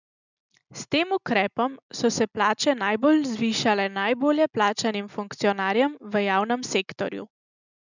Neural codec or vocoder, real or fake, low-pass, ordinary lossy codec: none; real; 7.2 kHz; none